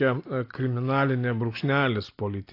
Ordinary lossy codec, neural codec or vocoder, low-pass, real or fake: AAC, 32 kbps; none; 5.4 kHz; real